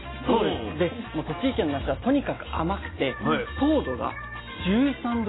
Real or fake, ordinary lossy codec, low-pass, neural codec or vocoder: real; AAC, 16 kbps; 7.2 kHz; none